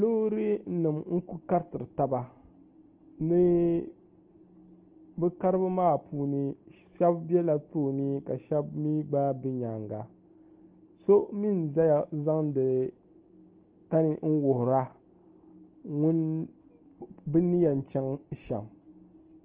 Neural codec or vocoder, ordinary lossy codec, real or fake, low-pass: none; Opus, 16 kbps; real; 3.6 kHz